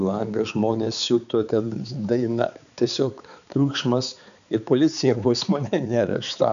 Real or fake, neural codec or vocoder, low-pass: fake; codec, 16 kHz, 4 kbps, X-Codec, HuBERT features, trained on balanced general audio; 7.2 kHz